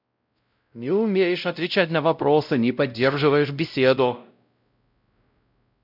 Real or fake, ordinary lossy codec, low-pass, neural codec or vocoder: fake; none; 5.4 kHz; codec, 16 kHz, 0.5 kbps, X-Codec, WavLM features, trained on Multilingual LibriSpeech